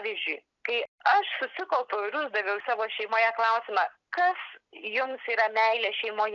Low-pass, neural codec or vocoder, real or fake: 7.2 kHz; none; real